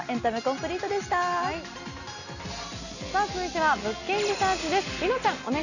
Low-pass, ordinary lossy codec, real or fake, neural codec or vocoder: 7.2 kHz; none; real; none